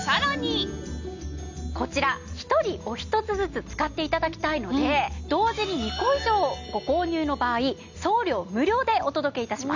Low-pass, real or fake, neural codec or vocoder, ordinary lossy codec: 7.2 kHz; real; none; none